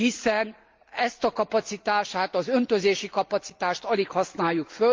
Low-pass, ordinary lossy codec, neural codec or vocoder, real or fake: 7.2 kHz; Opus, 32 kbps; none; real